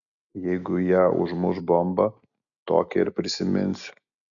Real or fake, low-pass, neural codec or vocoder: real; 7.2 kHz; none